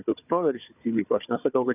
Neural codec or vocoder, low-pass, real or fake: codec, 16 kHz, 4 kbps, FunCodec, trained on Chinese and English, 50 frames a second; 3.6 kHz; fake